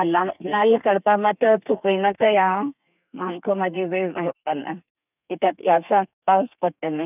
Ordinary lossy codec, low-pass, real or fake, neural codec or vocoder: none; 3.6 kHz; fake; codec, 32 kHz, 1.9 kbps, SNAC